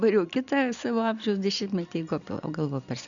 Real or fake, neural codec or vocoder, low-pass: real; none; 7.2 kHz